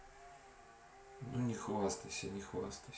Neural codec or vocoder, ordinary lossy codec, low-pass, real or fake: none; none; none; real